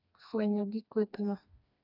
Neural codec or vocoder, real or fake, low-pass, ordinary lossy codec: codec, 16 kHz, 2 kbps, FreqCodec, smaller model; fake; 5.4 kHz; none